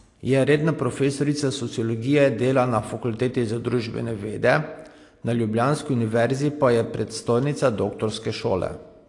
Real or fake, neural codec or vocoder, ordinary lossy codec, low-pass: real; none; AAC, 48 kbps; 10.8 kHz